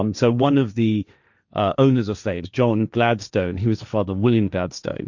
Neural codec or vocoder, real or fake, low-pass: codec, 16 kHz, 1.1 kbps, Voila-Tokenizer; fake; 7.2 kHz